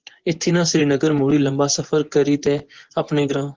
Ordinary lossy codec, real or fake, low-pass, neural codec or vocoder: Opus, 16 kbps; fake; 7.2 kHz; vocoder, 24 kHz, 100 mel bands, Vocos